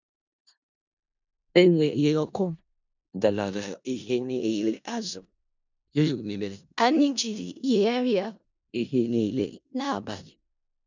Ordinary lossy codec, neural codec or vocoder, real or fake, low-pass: none; codec, 16 kHz in and 24 kHz out, 0.4 kbps, LongCat-Audio-Codec, four codebook decoder; fake; 7.2 kHz